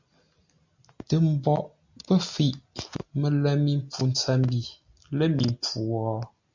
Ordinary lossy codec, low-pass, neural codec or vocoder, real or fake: MP3, 64 kbps; 7.2 kHz; none; real